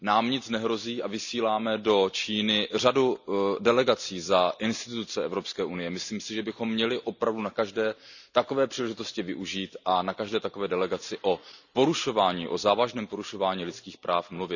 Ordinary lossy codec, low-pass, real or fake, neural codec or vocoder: none; 7.2 kHz; real; none